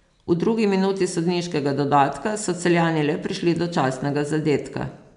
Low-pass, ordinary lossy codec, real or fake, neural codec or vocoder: 10.8 kHz; MP3, 96 kbps; real; none